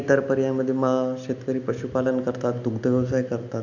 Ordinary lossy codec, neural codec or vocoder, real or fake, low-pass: none; none; real; 7.2 kHz